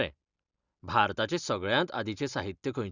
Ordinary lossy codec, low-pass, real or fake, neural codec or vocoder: Opus, 64 kbps; 7.2 kHz; real; none